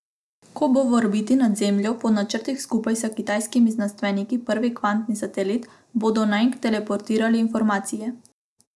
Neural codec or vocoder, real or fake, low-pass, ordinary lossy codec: none; real; none; none